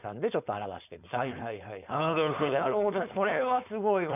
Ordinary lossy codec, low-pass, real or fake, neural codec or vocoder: none; 3.6 kHz; fake; codec, 16 kHz, 4.8 kbps, FACodec